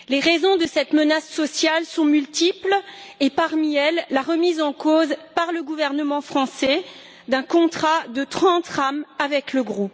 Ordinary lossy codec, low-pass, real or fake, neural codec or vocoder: none; none; real; none